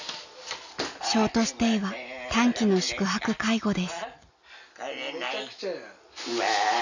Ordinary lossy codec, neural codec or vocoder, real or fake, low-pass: none; none; real; 7.2 kHz